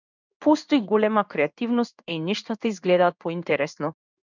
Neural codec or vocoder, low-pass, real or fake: codec, 16 kHz in and 24 kHz out, 1 kbps, XY-Tokenizer; 7.2 kHz; fake